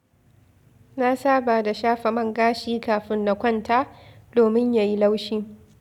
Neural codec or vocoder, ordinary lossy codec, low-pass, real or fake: none; none; 19.8 kHz; real